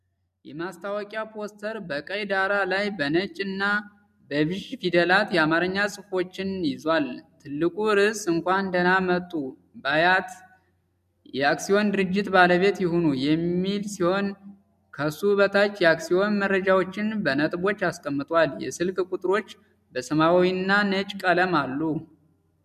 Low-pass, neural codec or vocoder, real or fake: 14.4 kHz; none; real